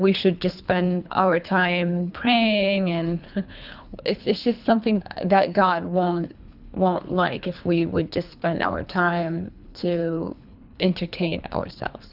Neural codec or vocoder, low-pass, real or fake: codec, 24 kHz, 3 kbps, HILCodec; 5.4 kHz; fake